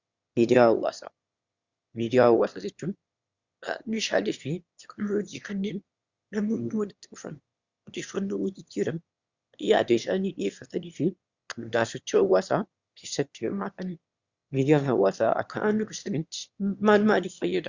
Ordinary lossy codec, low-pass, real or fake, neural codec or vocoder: Opus, 64 kbps; 7.2 kHz; fake; autoencoder, 22.05 kHz, a latent of 192 numbers a frame, VITS, trained on one speaker